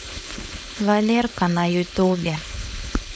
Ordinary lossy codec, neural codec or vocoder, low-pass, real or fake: none; codec, 16 kHz, 4.8 kbps, FACodec; none; fake